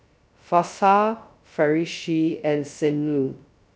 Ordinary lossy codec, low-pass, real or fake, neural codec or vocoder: none; none; fake; codec, 16 kHz, 0.2 kbps, FocalCodec